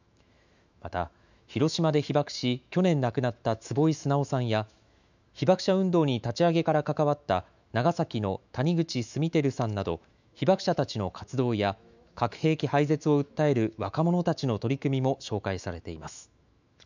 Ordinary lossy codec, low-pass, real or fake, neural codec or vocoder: none; 7.2 kHz; fake; autoencoder, 48 kHz, 128 numbers a frame, DAC-VAE, trained on Japanese speech